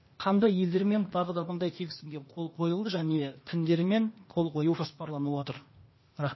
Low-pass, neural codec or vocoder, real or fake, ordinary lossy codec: 7.2 kHz; codec, 16 kHz, 0.8 kbps, ZipCodec; fake; MP3, 24 kbps